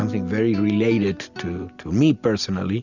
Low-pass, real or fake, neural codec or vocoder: 7.2 kHz; real; none